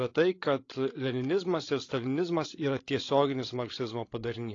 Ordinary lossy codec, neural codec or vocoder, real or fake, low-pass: AAC, 32 kbps; codec, 16 kHz, 16 kbps, FreqCodec, larger model; fake; 7.2 kHz